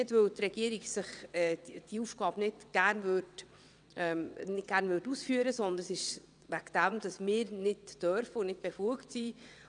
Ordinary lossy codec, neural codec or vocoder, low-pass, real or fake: none; vocoder, 22.05 kHz, 80 mel bands, WaveNeXt; 9.9 kHz; fake